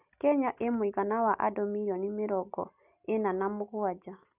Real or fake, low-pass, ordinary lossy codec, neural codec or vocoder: real; 3.6 kHz; none; none